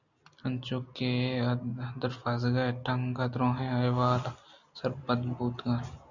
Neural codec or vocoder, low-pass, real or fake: none; 7.2 kHz; real